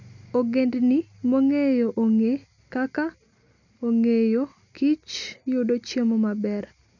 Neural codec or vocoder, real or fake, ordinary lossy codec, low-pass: none; real; none; 7.2 kHz